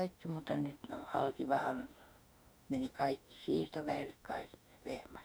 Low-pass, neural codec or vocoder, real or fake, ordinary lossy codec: none; autoencoder, 48 kHz, 32 numbers a frame, DAC-VAE, trained on Japanese speech; fake; none